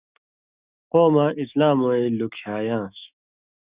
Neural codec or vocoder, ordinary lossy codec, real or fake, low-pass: autoencoder, 48 kHz, 128 numbers a frame, DAC-VAE, trained on Japanese speech; Opus, 64 kbps; fake; 3.6 kHz